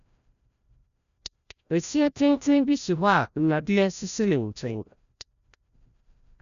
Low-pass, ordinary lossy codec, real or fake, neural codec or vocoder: 7.2 kHz; none; fake; codec, 16 kHz, 0.5 kbps, FreqCodec, larger model